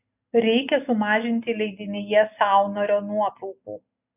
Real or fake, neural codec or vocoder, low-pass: real; none; 3.6 kHz